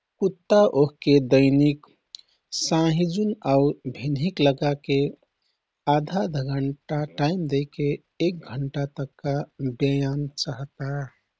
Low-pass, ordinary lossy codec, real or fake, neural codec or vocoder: none; none; real; none